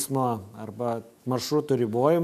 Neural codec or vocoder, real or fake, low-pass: autoencoder, 48 kHz, 128 numbers a frame, DAC-VAE, trained on Japanese speech; fake; 14.4 kHz